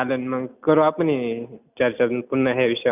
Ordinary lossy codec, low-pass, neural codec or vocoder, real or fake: none; 3.6 kHz; none; real